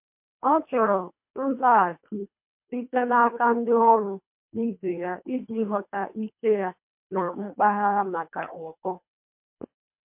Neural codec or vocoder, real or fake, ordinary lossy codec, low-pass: codec, 24 kHz, 1.5 kbps, HILCodec; fake; MP3, 32 kbps; 3.6 kHz